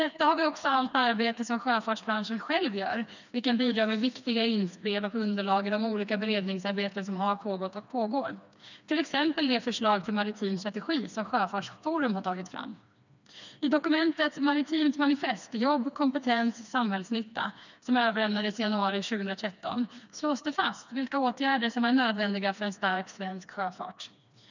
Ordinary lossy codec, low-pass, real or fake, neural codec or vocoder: none; 7.2 kHz; fake; codec, 16 kHz, 2 kbps, FreqCodec, smaller model